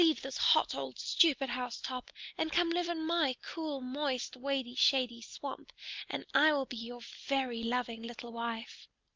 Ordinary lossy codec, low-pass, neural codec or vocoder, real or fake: Opus, 32 kbps; 7.2 kHz; none; real